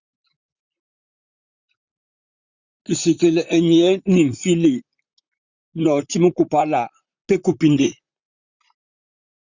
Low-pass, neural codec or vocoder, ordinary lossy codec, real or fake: 7.2 kHz; vocoder, 44.1 kHz, 128 mel bands, Pupu-Vocoder; Opus, 64 kbps; fake